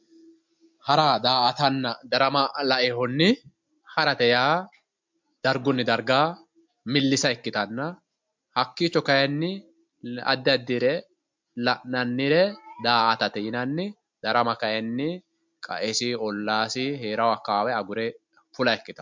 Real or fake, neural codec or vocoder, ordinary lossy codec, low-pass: real; none; MP3, 64 kbps; 7.2 kHz